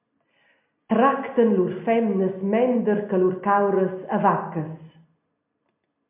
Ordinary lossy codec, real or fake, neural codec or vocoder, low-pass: MP3, 32 kbps; real; none; 3.6 kHz